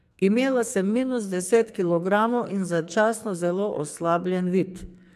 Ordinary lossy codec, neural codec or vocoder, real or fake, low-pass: AAC, 96 kbps; codec, 44.1 kHz, 2.6 kbps, SNAC; fake; 14.4 kHz